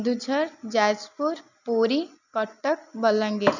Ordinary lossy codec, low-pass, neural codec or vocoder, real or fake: none; 7.2 kHz; none; real